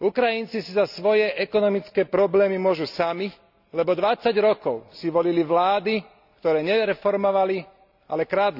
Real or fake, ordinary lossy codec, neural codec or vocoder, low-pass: real; none; none; 5.4 kHz